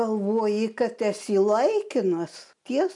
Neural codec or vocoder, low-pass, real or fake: none; 10.8 kHz; real